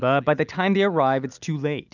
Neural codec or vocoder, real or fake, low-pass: none; real; 7.2 kHz